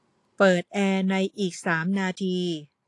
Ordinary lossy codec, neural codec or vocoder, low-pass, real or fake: AAC, 48 kbps; none; 10.8 kHz; real